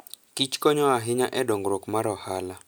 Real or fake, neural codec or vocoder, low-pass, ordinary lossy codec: real; none; none; none